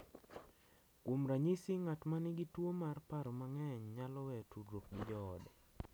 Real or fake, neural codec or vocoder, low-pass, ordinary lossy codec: real; none; none; none